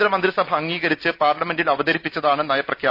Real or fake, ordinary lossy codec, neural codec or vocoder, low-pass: real; none; none; 5.4 kHz